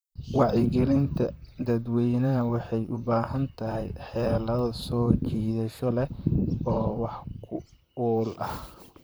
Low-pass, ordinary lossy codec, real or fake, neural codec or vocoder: none; none; fake; vocoder, 44.1 kHz, 128 mel bands, Pupu-Vocoder